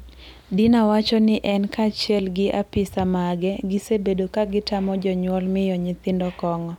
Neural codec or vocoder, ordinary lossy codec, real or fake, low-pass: none; none; real; 19.8 kHz